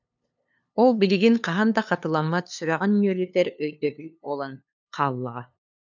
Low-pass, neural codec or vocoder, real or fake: 7.2 kHz; codec, 16 kHz, 2 kbps, FunCodec, trained on LibriTTS, 25 frames a second; fake